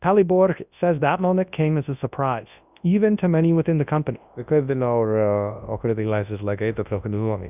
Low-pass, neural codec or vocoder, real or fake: 3.6 kHz; codec, 24 kHz, 0.9 kbps, WavTokenizer, large speech release; fake